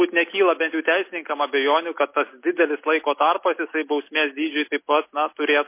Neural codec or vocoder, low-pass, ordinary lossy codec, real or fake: none; 3.6 kHz; MP3, 24 kbps; real